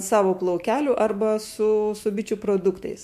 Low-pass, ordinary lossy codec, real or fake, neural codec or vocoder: 14.4 kHz; MP3, 64 kbps; fake; autoencoder, 48 kHz, 128 numbers a frame, DAC-VAE, trained on Japanese speech